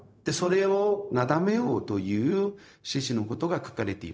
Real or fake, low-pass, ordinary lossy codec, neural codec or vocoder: fake; none; none; codec, 16 kHz, 0.4 kbps, LongCat-Audio-Codec